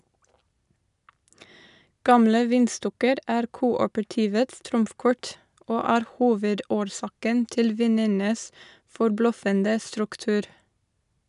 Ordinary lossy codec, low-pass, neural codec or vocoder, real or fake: none; 10.8 kHz; none; real